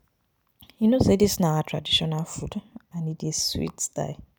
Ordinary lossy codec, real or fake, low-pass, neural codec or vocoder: none; real; none; none